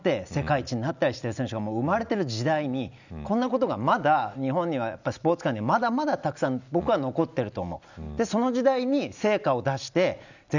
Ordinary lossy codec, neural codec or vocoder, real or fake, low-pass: none; none; real; 7.2 kHz